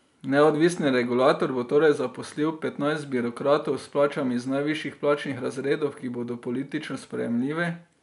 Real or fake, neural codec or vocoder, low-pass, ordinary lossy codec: real; none; 10.8 kHz; none